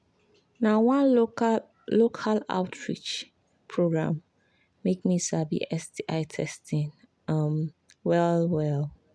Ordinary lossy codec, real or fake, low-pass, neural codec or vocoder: none; real; none; none